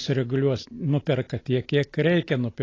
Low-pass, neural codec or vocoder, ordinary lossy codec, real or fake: 7.2 kHz; none; AAC, 32 kbps; real